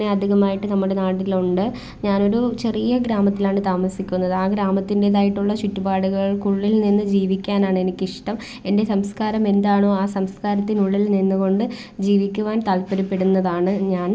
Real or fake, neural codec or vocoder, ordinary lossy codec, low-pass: real; none; none; none